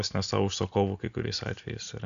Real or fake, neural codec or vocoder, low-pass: real; none; 7.2 kHz